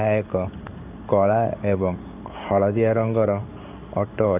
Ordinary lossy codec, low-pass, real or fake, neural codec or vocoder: AAC, 32 kbps; 3.6 kHz; fake; codec, 16 kHz in and 24 kHz out, 1 kbps, XY-Tokenizer